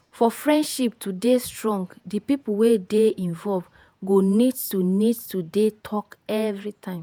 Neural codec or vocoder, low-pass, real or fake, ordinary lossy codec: vocoder, 48 kHz, 128 mel bands, Vocos; none; fake; none